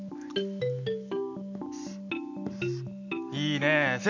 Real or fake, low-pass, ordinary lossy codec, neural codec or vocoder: real; 7.2 kHz; none; none